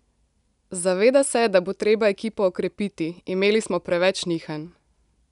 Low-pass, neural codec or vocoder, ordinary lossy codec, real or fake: 10.8 kHz; none; none; real